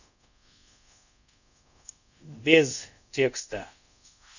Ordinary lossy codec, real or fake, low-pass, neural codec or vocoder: none; fake; 7.2 kHz; codec, 24 kHz, 0.5 kbps, DualCodec